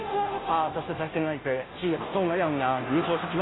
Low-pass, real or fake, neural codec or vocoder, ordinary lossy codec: 7.2 kHz; fake; codec, 16 kHz, 0.5 kbps, FunCodec, trained on Chinese and English, 25 frames a second; AAC, 16 kbps